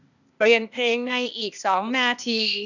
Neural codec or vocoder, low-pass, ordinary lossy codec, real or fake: codec, 16 kHz, 0.8 kbps, ZipCodec; 7.2 kHz; none; fake